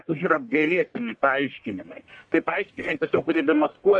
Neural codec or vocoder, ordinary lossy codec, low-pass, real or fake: codec, 44.1 kHz, 1.7 kbps, Pupu-Codec; AAC, 64 kbps; 9.9 kHz; fake